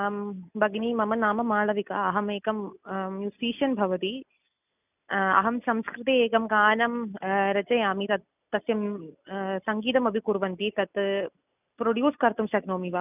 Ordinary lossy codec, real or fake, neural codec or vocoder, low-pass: none; real; none; 3.6 kHz